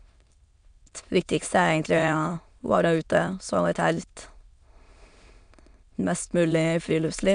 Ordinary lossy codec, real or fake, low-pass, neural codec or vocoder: none; fake; 9.9 kHz; autoencoder, 22.05 kHz, a latent of 192 numbers a frame, VITS, trained on many speakers